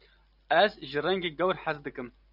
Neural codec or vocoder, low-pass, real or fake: none; 5.4 kHz; real